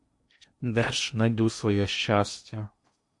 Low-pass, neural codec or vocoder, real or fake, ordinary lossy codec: 10.8 kHz; codec, 16 kHz in and 24 kHz out, 0.8 kbps, FocalCodec, streaming, 65536 codes; fake; MP3, 48 kbps